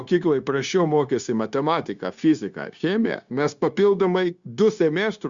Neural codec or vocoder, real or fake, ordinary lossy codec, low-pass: codec, 16 kHz, 0.9 kbps, LongCat-Audio-Codec; fake; Opus, 64 kbps; 7.2 kHz